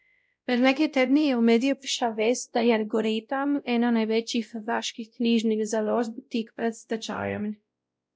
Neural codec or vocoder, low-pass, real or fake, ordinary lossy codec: codec, 16 kHz, 0.5 kbps, X-Codec, WavLM features, trained on Multilingual LibriSpeech; none; fake; none